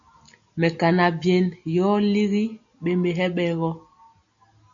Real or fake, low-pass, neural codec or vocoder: real; 7.2 kHz; none